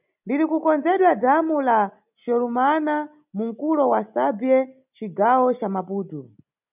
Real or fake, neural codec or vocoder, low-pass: real; none; 3.6 kHz